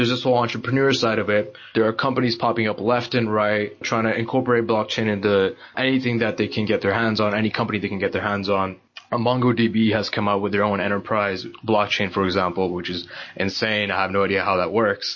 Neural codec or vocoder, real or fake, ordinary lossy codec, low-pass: none; real; MP3, 32 kbps; 7.2 kHz